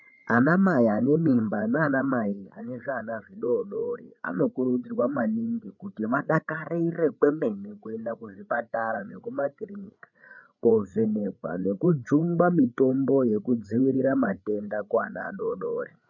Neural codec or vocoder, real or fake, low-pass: codec, 16 kHz, 8 kbps, FreqCodec, larger model; fake; 7.2 kHz